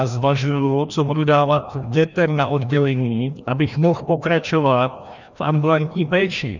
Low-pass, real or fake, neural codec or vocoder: 7.2 kHz; fake; codec, 16 kHz, 1 kbps, FreqCodec, larger model